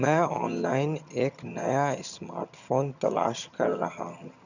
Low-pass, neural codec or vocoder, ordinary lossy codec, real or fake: 7.2 kHz; vocoder, 22.05 kHz, 80 mel bands, HiFi-GAN; none; fake